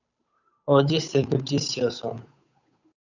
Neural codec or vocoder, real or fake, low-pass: codec, 16 kHz, 8 kbps, FunCodec, trained on Chinese and English, 25 frames a second; fake; 7.2 kHz